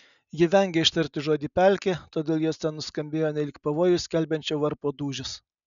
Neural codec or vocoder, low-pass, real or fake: none; 7.2 kHz; real